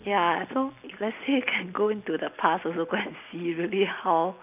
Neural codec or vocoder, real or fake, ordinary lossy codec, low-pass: none; real; none; 3.6 kHz